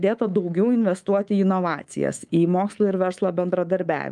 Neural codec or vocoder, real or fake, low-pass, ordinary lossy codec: codec, 24 kHz, 3.1 kbps, DualCodec; fake; 10.8 kHz; Opus, 32 kbps